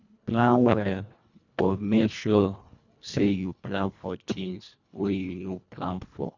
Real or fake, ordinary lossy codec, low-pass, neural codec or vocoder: fake; none; 7.2 kHz; codec, 24 kHz, 1.5 kbps, HILCodec